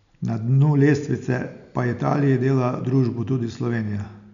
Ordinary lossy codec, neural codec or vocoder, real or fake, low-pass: none; none; real; 7.2 kHz